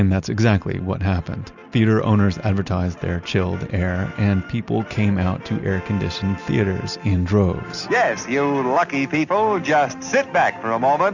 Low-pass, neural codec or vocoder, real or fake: 7.2 kHz; none; real